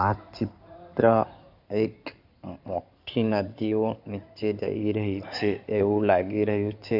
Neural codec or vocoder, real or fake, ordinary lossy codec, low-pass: codec, 16 kHz in and 24 kHz out, 2.2 kbps, FireRedTTS-2 codec; fake; none; 5.4 kHz